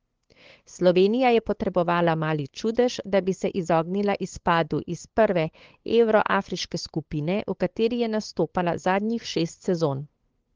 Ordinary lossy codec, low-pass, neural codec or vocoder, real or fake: Opus, 16 kbps; 7.2 kHz; codec, 16 kHz, 8 kbps, FunCodec, trained on LibriTTS, 25 frames a second; fake